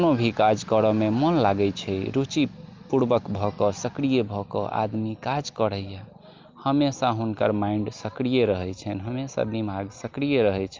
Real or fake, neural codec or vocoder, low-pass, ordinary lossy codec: real; none; 7.2 kHz; Opus, 32 kbps